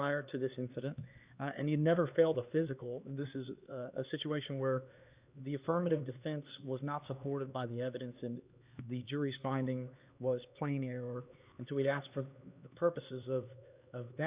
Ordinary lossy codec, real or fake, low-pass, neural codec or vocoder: Opus, 64 kbps; fake; 3.6 kHz; codec, 16 kHz, 2 kbps, X-Codec, HuBERT features, trained on LibriSpeech